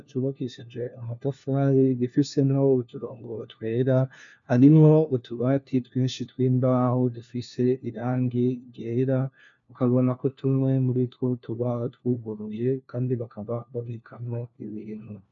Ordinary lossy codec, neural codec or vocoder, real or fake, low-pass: MP3, 64 kbps; codec, 16 kHz, 1 kbps, FunCodec, trained on LibriTTS, 50 frames a second; fake; 7.2 kHz